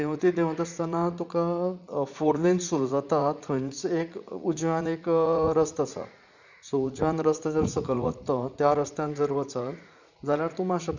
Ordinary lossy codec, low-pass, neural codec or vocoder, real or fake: none; 7.2 kHz; vocoder, 44.1 kHz, 80 mel bands, Vocos; fake